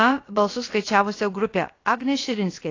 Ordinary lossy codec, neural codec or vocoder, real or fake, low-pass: AAC, 32 kbps; codec, 16 kHz, about 1 kbps, DyCAST, with the encoder's durations; fake; 7.2 kHz